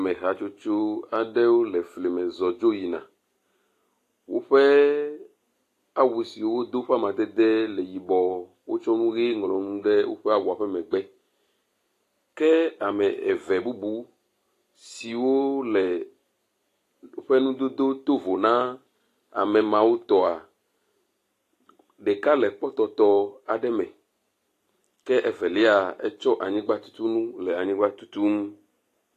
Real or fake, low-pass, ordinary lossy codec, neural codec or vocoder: real; 14.4 kHz; AAC, 48 kbps; none